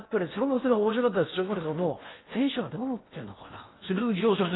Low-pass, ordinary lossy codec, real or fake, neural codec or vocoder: 7.2 kHz; AAC, 16 kbps; fake; codec, 16 kHz in and 24 kHz out, 0.8 kbps, FocalCodec, streaming, 65536 codes